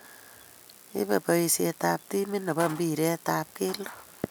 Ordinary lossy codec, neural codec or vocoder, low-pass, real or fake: none; none; none; real